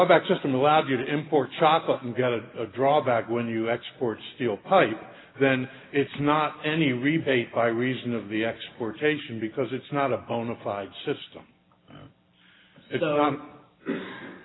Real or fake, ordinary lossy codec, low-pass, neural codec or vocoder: real; AAC, 16 kbps; 7.2 kHz; none